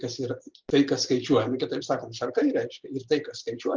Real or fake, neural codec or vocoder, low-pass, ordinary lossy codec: real; none; 7.2 kHz; Opus, 32 kbps